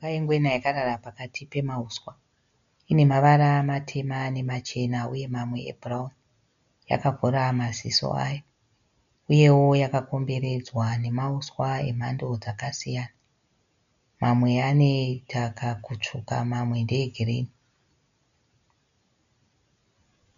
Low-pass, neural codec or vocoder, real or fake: 7.2 kHz; none; real